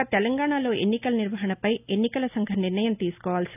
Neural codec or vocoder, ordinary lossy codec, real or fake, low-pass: none; none; real; 3.6 kHz